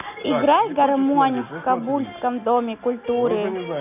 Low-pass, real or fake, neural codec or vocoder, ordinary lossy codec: 3.6 kHz; real; none; none